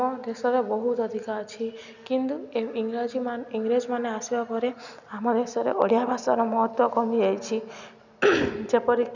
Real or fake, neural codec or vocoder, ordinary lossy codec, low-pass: real; none; none; 7.2 kHz